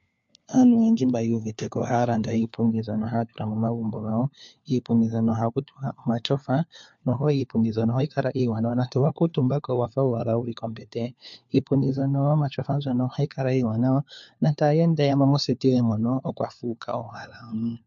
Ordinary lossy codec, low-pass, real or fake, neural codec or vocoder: MP3, 48 kbps; 7.2 kHz; fake; codec, 16 kHz, 4 kbps, FunCodec, trained on LibriTTS, 50 frames a second